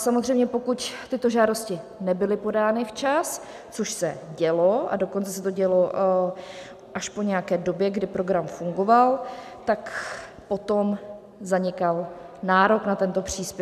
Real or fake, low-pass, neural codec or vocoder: real; 14.4 kHz; none